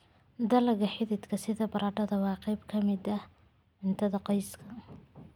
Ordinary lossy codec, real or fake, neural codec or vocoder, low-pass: none; real; none; 19.8 kHz